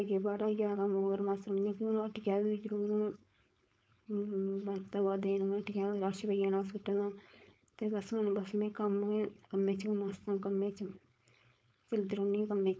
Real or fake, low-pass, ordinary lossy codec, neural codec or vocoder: fake; none; none; codec, 16 kHz, 4.8 kbps, FACodec